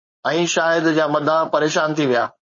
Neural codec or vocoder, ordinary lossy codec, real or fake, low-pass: codec, 16 kHz, 4.8 kbps, FACodec; MP3, 32 kbps; fake; 7.2 kHz